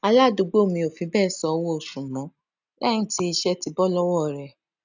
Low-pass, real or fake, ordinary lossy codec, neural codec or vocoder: 7.2 kHz; real; none; none